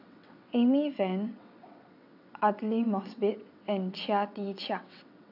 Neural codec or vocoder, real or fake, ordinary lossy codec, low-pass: vocoder, 22.05 kHz, 80 mel bands, WaveNeXt; fake; none; 5.4 kHz